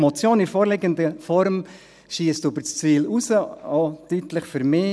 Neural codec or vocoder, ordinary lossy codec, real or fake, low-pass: none; none; real; none